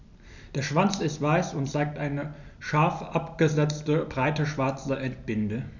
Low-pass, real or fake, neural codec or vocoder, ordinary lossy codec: 7.2 kHz; real; none; none